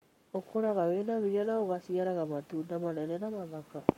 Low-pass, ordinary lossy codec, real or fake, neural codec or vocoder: 19.8 kHz; MP3, 64 kbps; fake; codec, 44.1 kHz, 7.8 kbps, Pupu-Codec